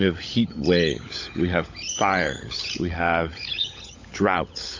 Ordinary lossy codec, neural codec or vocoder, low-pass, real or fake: AAC, 48 kbps; codec, 16 kHz, 16 kbps, FunCodec, trained on LibriTTS, 50 frames a second; 7.2 kHz; fake